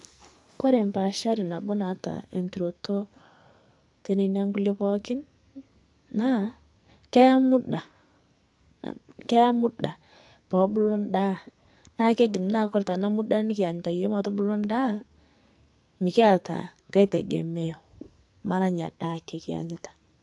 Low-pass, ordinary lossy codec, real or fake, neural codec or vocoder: 10.8 kHz; AAC, 64 kbps; fake; codec, 44.1 kHz, 2.6 kbps, SNAC